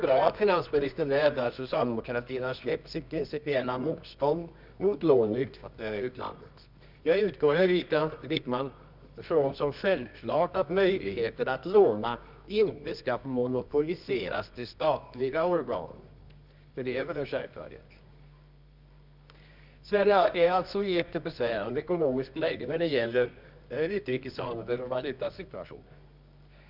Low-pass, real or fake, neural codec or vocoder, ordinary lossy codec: 5.4 kHz; fake; codec, 24 kHz, 0.9 kbps, WavTokenizer, medium music audio release; none